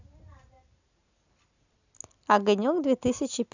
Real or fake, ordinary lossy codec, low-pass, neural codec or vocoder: real; none; 7.2 kHz; none